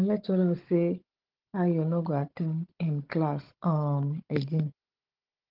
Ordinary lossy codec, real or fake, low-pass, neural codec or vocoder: Opus, 16 kbps; fake; 5.4 kHz; codec, 16 kHz, 16 kbps, FunCodec, trained on Chinese and English, 50 frames a second